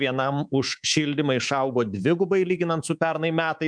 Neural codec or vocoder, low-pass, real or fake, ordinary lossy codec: codec, 24 kHz, 3.1 kbps, DualCodec; 9.9 kHz; fake; MP3, 96 kbps